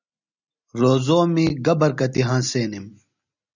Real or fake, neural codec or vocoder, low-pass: real; none; 7.2 kHz